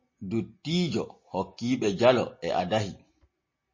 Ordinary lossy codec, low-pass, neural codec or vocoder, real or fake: MP3, 32 kbps; 7.2 kHz; none; real